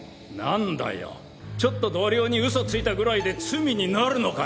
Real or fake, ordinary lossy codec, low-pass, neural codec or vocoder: real; none; none; none